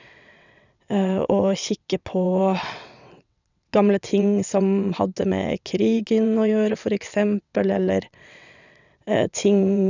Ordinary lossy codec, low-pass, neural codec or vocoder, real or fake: none; 7.2 kHz; vocoder, 22.05 kHz, 80 mel bands, WaveNeXt; fake